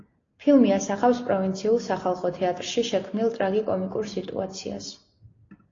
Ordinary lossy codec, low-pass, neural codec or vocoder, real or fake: AAC, 32 kbps; 7.2 kHz; none; real